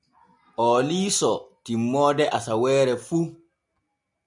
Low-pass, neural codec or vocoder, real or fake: 10.8 kHz; none; real